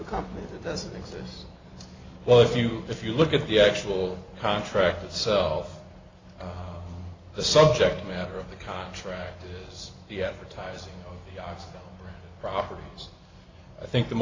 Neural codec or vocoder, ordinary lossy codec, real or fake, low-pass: none; AAC, 32 kbps; real; 7.2 kHz